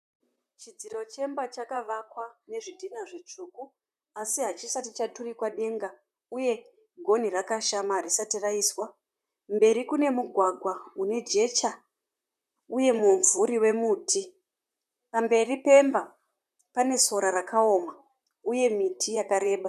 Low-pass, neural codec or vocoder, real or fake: 14.4 kHz; vocoder, 44.1 kHz, 128 mel bands, Pupu-Vocoder; fake